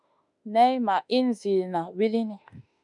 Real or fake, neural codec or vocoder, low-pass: fake; autoencoder, 48 kHz, 32 numbers a frame, DAC-VAE, trained on Japanese speech; 10.8 kHz